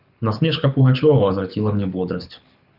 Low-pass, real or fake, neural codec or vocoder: 5.4 kHz; fake; codec, 24 kHz, 6 kbps, HILCodec